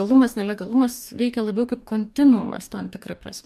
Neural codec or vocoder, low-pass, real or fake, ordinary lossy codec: codec, 44.1 kHz, 2.6 kbps, DAC; 14.4 kHz; fake; MP3, 96 kbps